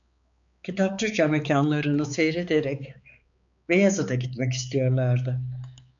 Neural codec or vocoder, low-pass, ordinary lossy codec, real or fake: codec, 16 kHz, 4 kbps, X-Codec, HuBERT features, trained on balanced general audio; 7.2 kHz; MP3, 64 kbps; fake